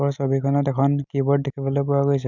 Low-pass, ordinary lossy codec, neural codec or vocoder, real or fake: 7.2 kHz; none; none; real